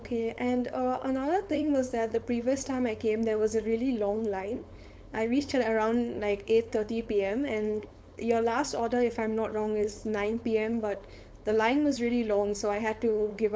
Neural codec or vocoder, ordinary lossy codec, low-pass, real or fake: codec, 16 kHz, 4.8 kbps, FACodec; none; none; fake